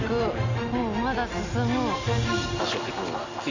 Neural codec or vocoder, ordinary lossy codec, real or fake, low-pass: none; none; real; 7.2 kHz